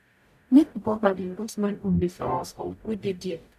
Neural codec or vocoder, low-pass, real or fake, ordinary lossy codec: codec, 44.1 kHz, 0.9 kbps, DAC; 14.4 kHz; fake; none